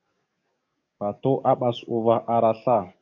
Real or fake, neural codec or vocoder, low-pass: fake; codec, 44.1 kHz, 7.8 kbps, DAC; 7.2 kHz